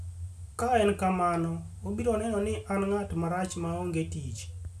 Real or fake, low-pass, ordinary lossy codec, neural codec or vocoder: real; 14.4 kHz; none; none